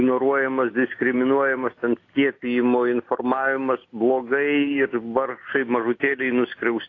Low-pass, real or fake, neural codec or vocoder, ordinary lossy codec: 7.2 kHz; real; none; AAC, 32 kbps